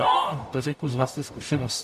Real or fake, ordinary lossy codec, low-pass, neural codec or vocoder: fake; AAC, 96 kbps; 14.4 kHz; codec, 44.1 kHz, 0.9 kbps, DAC